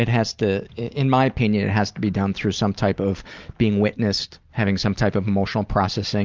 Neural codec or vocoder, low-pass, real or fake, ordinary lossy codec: none; 7.2 kHz; real; Opus, 24 kbps